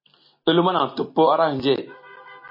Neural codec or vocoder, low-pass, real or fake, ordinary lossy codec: none; 5.4 kHz; real; MP3, 24 kbps